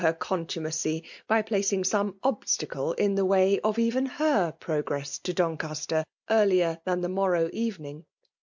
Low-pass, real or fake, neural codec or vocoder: 7.2 kHz; real; none